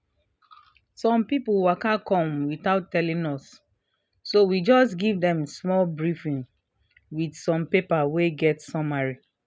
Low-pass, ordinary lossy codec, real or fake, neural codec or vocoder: none; none; real; none